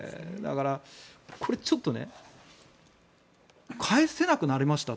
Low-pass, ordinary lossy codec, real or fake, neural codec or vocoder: none; none; real; none